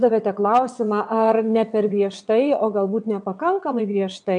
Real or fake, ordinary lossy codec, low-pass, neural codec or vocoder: fake; MP3, 96 kbps; 9.9 kHz; vocoder, 22.05 kHz, 80 mel bands, WaveNeXt